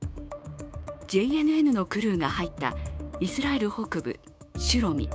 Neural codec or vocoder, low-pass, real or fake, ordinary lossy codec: codec, 16 kHz, 6 kbps, DAC; none; fake; none